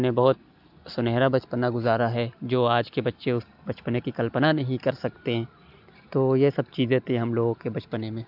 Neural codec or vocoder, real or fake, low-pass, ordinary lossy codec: none; real; 5.4 kHz; AAC, 48 kbps